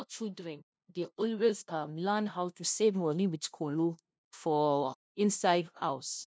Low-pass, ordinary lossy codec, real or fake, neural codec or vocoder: none; none; fake; codec, 16 kHz, 0.5 kbps, FunCodec, trained on LibriTTS, 25 frames a second